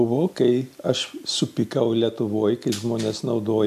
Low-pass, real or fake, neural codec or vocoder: 14.4 kHz; real; none